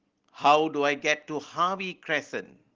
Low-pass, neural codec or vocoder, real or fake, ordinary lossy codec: 7.2 kHz; none; real; Opus, 16 kbps